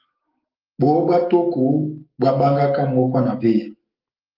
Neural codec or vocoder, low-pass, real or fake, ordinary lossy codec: autoencoder, 48 kHz, 128 numbers a frame, DAC-VAE, trained on Japanese speech; 5.4 kHz; fake; Opus, 32 kbps